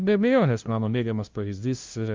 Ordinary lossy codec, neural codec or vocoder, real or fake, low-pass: Opus, 32 kbps; codec, 16 kHz, 0.5 kbps, FunCodec, trained on LibriTTS, 25 frames a second; fake; 7.2 kHz